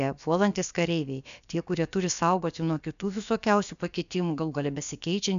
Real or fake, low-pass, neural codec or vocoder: fake; 7.2 kHz; codec, 16 kHz, about 1 kbps, DyCAST, with the encoder's durations